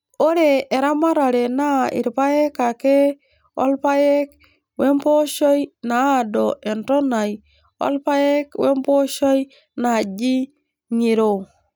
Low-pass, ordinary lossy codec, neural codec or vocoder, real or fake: none; none; none; real